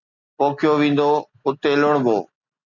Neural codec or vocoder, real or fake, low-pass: none; real; 7.2 kHz